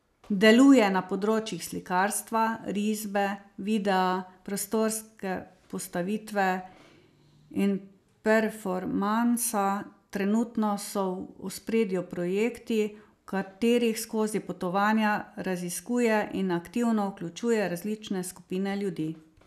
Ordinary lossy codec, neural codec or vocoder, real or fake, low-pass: none; none; real; 14.4 kHz